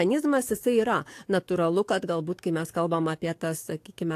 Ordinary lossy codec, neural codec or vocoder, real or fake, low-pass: AAC, 64 kbps; codec, 44.1 kHz, 7.8 kbps, DAC; fake; 14.4 kHz